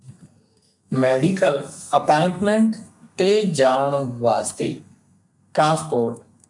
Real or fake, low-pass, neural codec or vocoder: fake; 10.8 kHz; codec, 32 kHz, 1.9 kbps, SNAC